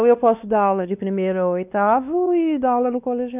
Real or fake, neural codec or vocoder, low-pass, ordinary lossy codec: fake; codec, 16 kHz, 2 kbps, X-Codec, WavLM features, trained on Multilingual LibriSpeech; 3.6 kHz; none